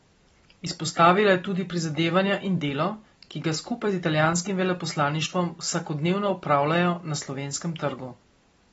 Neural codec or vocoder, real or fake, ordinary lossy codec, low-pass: none; real; AAC, 24 kbps; 19.8 kHz